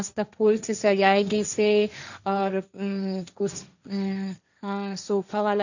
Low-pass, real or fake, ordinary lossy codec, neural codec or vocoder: 7.2 kHz; fake; none; codec, 16 kHz, 1.1 kbps, Voila-Tokenizer